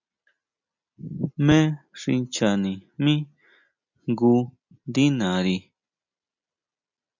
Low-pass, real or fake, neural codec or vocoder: 7.2 kHz; real; none